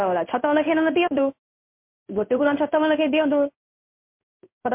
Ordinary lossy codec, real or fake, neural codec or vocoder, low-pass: MP3, 32 kbps; fake; codec, 16 kHz in and 24 kHz out, 1 kbps, XY-Tokenizer; 3.6 kHz